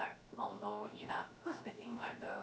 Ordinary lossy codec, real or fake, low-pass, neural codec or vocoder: none; fake; none; codec, 16 kHz, 0.7 kbps, FocalCodec